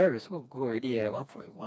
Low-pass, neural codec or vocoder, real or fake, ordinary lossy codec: none; codec, 16 kHz, 2 kbps, FreqCodec, smaller model; fake; none